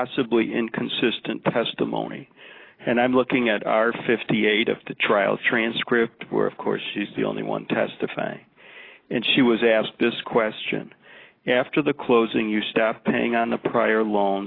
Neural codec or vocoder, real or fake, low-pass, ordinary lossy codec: none; real; 5.4 kHz; AAC, 24 kbps